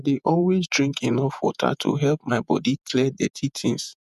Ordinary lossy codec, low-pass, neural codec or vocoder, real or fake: none; 14.4 kHz; vocoder, 48 kHz, 128 mel bands, Vocos; fake